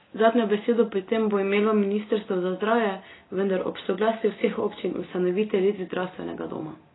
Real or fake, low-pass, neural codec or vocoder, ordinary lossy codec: real; 7.2 kHz; none; AAC, 16 kbps